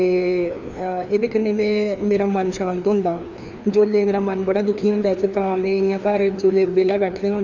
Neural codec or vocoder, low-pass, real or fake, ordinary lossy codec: codec, 16 kHz, 2 kbps, FreqCodec, larger model; 7.2 kHz; fake; none